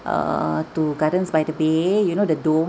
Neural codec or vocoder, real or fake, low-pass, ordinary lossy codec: none; real; none; none